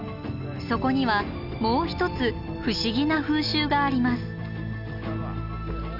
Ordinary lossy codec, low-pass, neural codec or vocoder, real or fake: none; 5.4 kHz; none; real